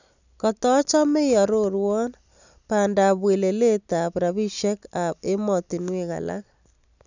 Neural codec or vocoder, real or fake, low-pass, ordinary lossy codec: none; real; 7.2 kHz; none